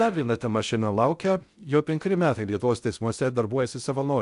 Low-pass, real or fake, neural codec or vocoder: 10.8 kHz; fake; codec, 16 kHz in and 24 kHz out, 0.6 kbps, FocalCodec, streaming, 4096 codes